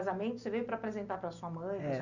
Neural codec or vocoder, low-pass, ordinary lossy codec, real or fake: none; 7.2 kHz; none; real